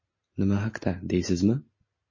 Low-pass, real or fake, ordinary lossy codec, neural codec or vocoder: 7.2 kHz; real; MP3, 32 kbps; none